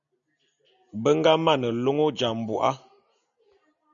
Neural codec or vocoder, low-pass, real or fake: none; 7.2 kHz; real